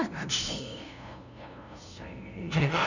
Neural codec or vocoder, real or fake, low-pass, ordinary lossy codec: codec, 16 kHz, 0.5 kbps, FunCodec, trained on LibriTTS, 25 frames a second; fake; 7.2 kHz; none